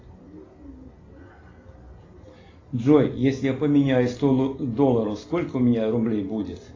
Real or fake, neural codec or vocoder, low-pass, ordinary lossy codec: real; none; 7.2 kHz; AAC, 32 kbps